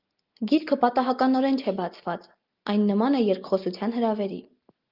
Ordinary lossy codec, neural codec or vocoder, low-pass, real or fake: Opus, 24 kbps; none; 5.4 kHz; real